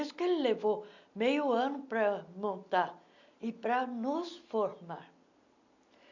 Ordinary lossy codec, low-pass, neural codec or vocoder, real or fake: none; 7.2 kHz; none; real